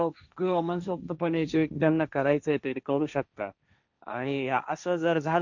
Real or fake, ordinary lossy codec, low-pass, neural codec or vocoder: fake; none; 7.2 kHz; codec, 16 kHz, 1.1 kbps, Voila-Tokenizer